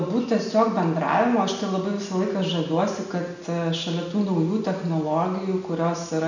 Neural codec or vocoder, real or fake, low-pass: none; real; 7.2 kHz